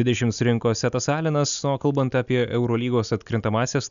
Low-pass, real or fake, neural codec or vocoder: 7.2 kHz; real; none